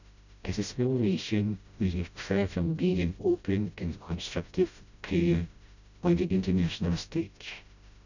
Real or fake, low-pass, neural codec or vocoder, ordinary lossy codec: fake; 7.2 kHz; codec, 16 kHz, 0.5 kbps, FreqCodec, smaller model; none